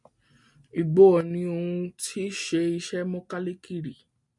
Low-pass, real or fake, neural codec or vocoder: 10.8 kHz; real; none